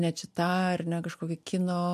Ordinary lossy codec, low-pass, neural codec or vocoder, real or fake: MP3, 64 kbps; 14.4 kHz; none; real